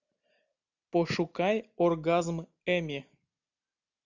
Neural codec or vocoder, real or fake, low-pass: none; real; 7.2 kHz